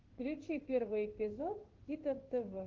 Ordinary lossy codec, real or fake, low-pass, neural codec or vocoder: Opus, 16 kbps; fake; 7.2 kHz; codec, 16 kHz in and 24 kHz out, 1 kbps, XY-Tokenizer